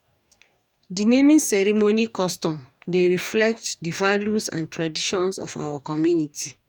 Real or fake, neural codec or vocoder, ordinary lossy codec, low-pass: fake; codec, 44.1 kHz, 2.6 kbps, DAC; none; 19.8 kHz